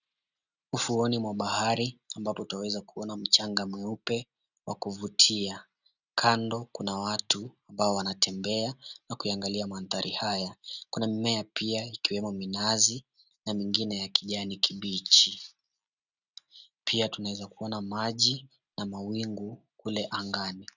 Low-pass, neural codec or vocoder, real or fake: 7.2 kHz; none; real